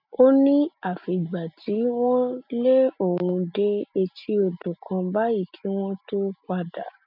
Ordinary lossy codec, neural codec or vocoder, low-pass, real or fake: none; none; 5.4 kHz; real